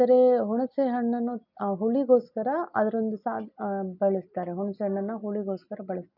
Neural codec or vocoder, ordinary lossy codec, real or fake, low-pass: none; none; real; 5.4 kHz